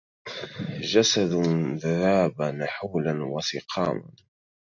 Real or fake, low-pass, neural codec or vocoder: real; 7.2 kHz; none